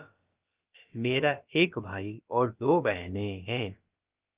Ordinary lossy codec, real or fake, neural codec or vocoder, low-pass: Opus, 24 kbps; fake; codec, 16 kHz, about 1 kbps, DyCAST, with the encoder's durations; 3.6 kHz